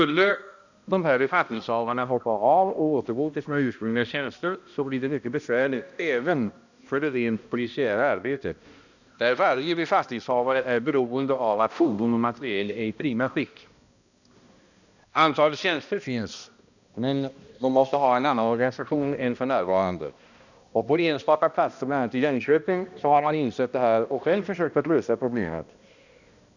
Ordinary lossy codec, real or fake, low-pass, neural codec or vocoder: none; fake; 7.2 kHz; codec, 16 kHz, 1 kbps, X-Codec, HuBERT features, trained on balanced general audio